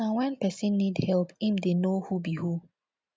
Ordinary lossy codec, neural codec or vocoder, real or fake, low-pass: none; none; real; none